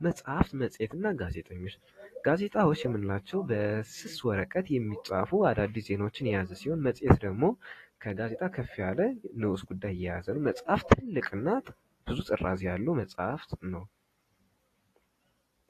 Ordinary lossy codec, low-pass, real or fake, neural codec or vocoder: AAC, 48 kbps; 14.4 kHz; real; none